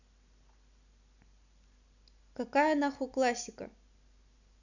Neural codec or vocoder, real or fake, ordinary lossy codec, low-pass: none; real; none; 7.2 kHz